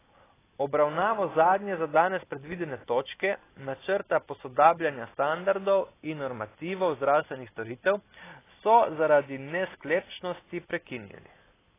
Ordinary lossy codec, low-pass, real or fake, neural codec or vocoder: AAC, 16 kbps; 3.6 kHz; real; none